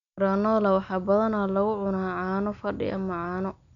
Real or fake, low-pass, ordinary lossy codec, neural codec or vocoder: real; 7.2 kHz; none; none